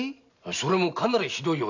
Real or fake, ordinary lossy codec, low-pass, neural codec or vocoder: real; none; 7.2 kHz; none